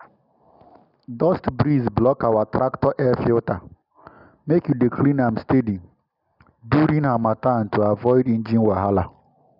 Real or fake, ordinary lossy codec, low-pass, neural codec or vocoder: real; none; 5.4 kHz; none